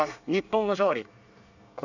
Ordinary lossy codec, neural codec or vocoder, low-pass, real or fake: none; codec, 24 kHz, 1 kbps, SNAC; 7.2 kHz; fake